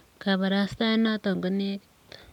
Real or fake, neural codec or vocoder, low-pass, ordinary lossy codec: real; none; 19.8 kHz; none